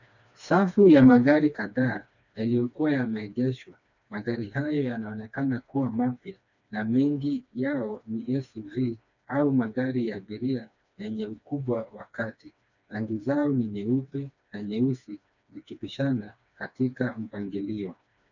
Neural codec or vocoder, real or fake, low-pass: codec, 16 kHz, 2 kbps, FreqCodec, smaller model; fake; 7.2 kHz